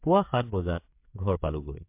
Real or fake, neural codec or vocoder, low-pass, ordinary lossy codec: fake; codec, 16 kHz, 8 kbps, FreqCodec, smaller model; 3.6 kHz; MP3, 32 kbps